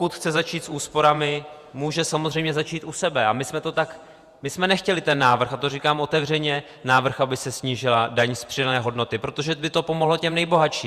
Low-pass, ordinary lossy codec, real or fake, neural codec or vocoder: 14.4 kHz; Opus, 64 kbps; fake; vocoder, 48 kHz, 128 mel bands, Vocos